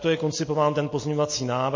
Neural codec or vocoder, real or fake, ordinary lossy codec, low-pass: none; real; MP3, 32 kbps; 7.2 kHz